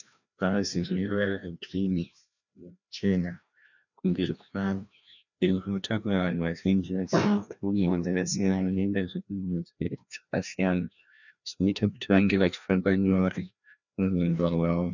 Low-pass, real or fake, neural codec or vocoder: 7.2 kHz; fake; codec, 16 kHz, 1 kbps, FreqCodec, larger model